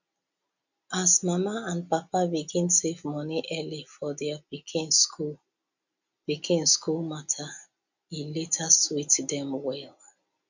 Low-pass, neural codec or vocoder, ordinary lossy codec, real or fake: 7.2 kHz; none; none; real